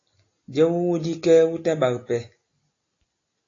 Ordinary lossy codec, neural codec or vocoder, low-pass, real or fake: AAC, 32 kbps; none; 7.2 kHz; real